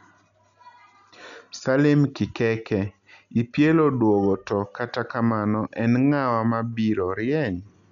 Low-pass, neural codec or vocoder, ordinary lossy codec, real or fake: 7.2 kHz; none; none; real